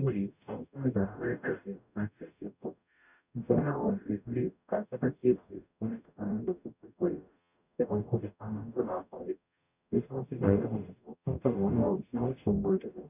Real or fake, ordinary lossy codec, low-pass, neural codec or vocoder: fake; none; 3.6 kHz; codec, 44.1 kHz, 0.9 kbps, DAC